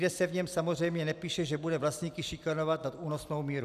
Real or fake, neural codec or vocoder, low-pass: real; none; 14.4 kHz